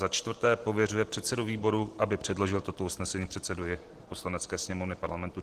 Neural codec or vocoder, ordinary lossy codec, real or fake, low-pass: none; Opus, 16 kbps; real; 14.4 kHz